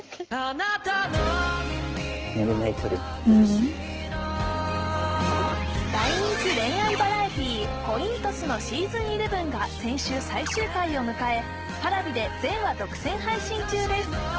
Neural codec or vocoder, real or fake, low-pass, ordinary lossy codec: autoencoder, 48 kHz, 128 numbers a frame, DAC-VAE, trained on Japanese speech; fake; 7.2 kHz; Opus, 16 kbps